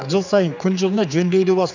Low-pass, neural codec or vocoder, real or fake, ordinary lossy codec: 7.2 kHz; codec, 16 kHz, 4 kbps, X-Codec, HuBERT features, trained on general audio; fake; none